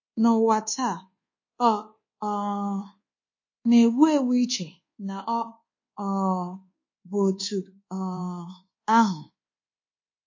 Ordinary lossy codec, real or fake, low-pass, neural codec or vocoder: MP3, 32 kbps; fake; 7.2 kHz; codec, 24 kHz, 1.2 kbps, DualCodec